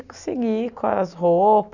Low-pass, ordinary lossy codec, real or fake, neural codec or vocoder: 7.2 kHz; none; real; none